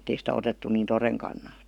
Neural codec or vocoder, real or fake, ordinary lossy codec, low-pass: codec, 44.1 kHz, 7.8 kbps, Pupu-Codec; fake; none; 19.8 kHz